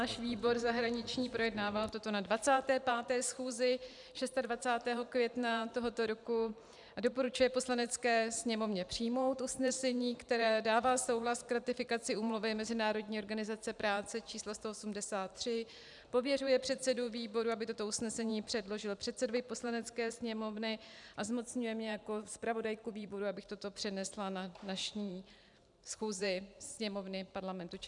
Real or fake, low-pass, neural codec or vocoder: fake; 10.8 kHz; vocoder, 44.1 kHz, 128 mel bands every 512 samples, BigVGAN v2